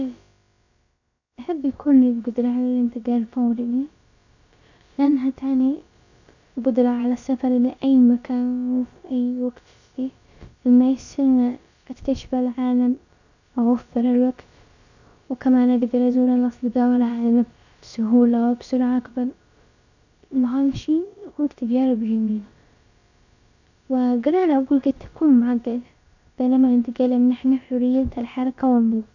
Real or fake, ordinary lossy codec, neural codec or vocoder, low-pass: fake; none; codec, 16 kHz, about 1 kbps, DyCAST, with the encoder's durations; 7.2 kHz